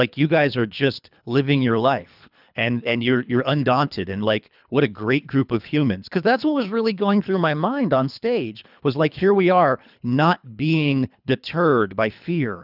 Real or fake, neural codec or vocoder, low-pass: fake; codec, 24 kHz, 3 kbps, HILCodec; 5.4 kHz